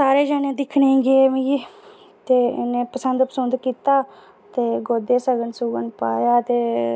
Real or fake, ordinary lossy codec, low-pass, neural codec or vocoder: real; none; none; none